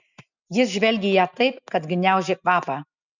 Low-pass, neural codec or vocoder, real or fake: 7.2 kHz; none; real